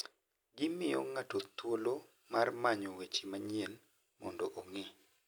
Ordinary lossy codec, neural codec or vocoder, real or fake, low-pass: none; none; real; none